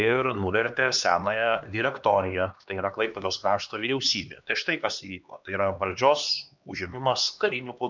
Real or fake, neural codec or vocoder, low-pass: fake; codec, 16 kHz, 2 kbps, X-Codec, HuBERT features, trained on LibriSpeech; 7.2 kHz